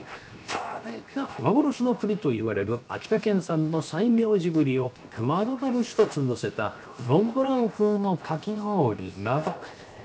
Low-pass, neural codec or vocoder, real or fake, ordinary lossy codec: none; codec, 16 kHz, 0.7 kbps, FocalCodec; fake; none